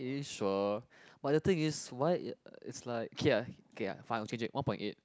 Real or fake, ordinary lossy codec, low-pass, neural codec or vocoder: real; none; none; none